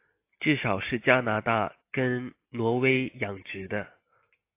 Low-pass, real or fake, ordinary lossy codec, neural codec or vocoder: 3.6 kHz; real; AAC, 24 kbps; none